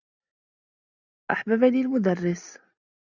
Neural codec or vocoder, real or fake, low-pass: none; real; 7.2 kHz